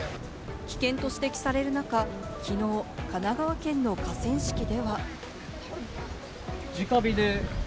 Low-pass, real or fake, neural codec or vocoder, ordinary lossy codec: none; real; none; none